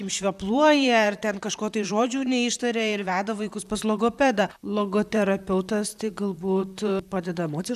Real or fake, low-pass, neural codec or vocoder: fake; 14.4 kHz; vocoder, 44.1 kHz, 128 mel bands, Pupu-Vocoder